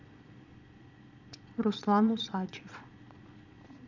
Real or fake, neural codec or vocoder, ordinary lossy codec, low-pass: fake; vocoder, 22.05 kHz, 80 mel bands, Vocos; none; 7.2 kHz